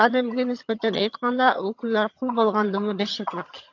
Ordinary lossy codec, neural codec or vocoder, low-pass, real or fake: AAC, 48 kbps; vocoder, 22.05 kHz, 80 mel bands, HiFi-GAN; 7.2 kHz; fake